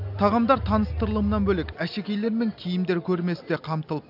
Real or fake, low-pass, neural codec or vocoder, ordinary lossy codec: real; 5.4 kHz; none; none